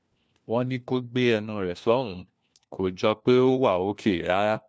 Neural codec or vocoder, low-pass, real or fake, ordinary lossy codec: codec, 16 kHz, 1 kbps, FunCodec, trained on LibriTTS, 50 frames a second; none; fake; none